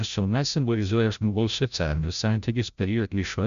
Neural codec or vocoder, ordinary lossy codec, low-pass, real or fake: codec, 16 kHz, 0.5 kbps, FreqCodec, larger model; MP3, 96 kbps; 7.2 kHz; fake